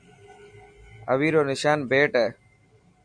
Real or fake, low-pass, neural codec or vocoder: real; 9.9 kHz; none